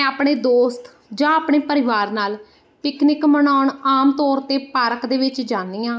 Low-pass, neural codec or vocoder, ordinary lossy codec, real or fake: none; none; none; real